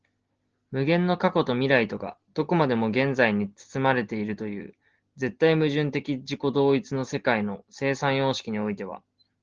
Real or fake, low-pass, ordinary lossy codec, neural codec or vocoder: real; 7.2 kHz; Opus, 16 kbps; none